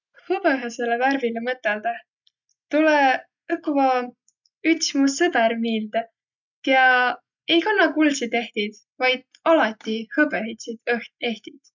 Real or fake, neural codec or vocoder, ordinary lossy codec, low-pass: real; none; none; 7.2 kHz